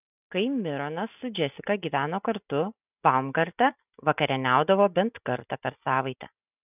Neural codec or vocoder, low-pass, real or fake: none; 3.6 kHz; real